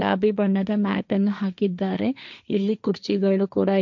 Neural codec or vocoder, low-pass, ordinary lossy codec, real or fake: codec, 16 kHz, 1.1 kbps, Voila-Tokenizer; 7.2 kHz; none; fake